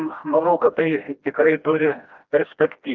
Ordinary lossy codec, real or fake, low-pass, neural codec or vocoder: Opus, 32 kbps; fake; 7.2 kHz; codec, 16 kHz, 1 kbps, FreqCodec, smaller model